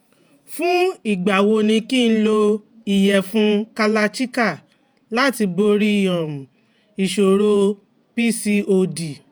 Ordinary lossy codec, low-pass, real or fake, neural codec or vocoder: none; none; fake; vocoder, 48 kHz, 128 mel bands, Vocos